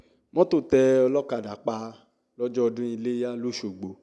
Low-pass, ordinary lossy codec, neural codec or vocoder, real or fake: none; none; none; real